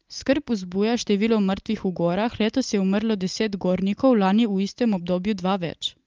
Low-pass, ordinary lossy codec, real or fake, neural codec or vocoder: 7.2 kHz; Opus, 32 kbps; real; none